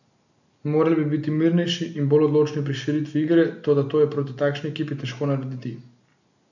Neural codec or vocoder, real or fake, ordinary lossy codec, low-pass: none; real; none; 7.2 kHz